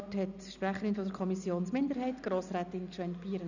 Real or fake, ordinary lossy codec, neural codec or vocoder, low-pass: real; none; none; 7.2 kHz